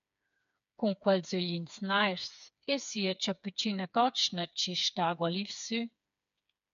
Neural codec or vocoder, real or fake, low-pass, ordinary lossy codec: codec, 16 kHz, 4 kbps, FreqCodec, smaller model; fake; 7.2 kHz; AAC, 96 kbps